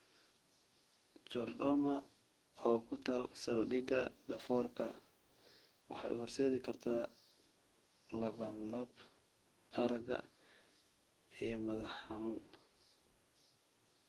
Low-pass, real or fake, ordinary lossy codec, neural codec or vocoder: 19.8 kHz; fake; Opus, 24 kbps; codec, 44.1 kHz, 2.6 kbps, DAC